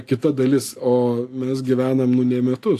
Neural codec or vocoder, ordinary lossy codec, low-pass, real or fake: none; AAC, 64 kbps; 14.4 kHz; real